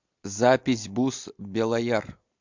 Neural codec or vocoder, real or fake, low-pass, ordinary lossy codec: none; real; 7.2 kHz; MP3, 64 kbps